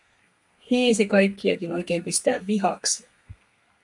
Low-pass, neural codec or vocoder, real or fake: 10.8 kHz; codec, 32 kHz, 1.9 kbps, SNAC; fake